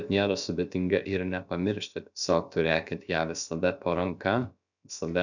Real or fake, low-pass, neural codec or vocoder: fake; 7.2 kHz; codec, 16 kHz, about 1 kbps, DyCAST, with the encoder's durations